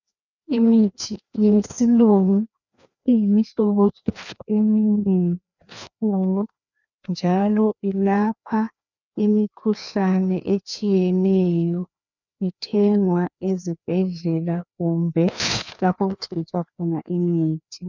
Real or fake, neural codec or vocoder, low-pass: fake; codec, 16 kHz, 2 kbps, FreqCodec, larger model; 7.2 kHz